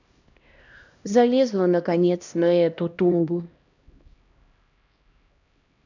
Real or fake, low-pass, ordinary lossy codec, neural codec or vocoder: fake; 7.2 kHz; none; codec, 16 kHz, 1 kbps, X-Codec, HuBERT features, trained on LibriSpeech